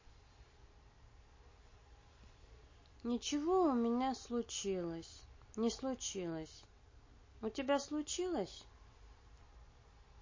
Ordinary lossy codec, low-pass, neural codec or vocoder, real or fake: MP3, 32 kbps; 7.2 kHz; none; real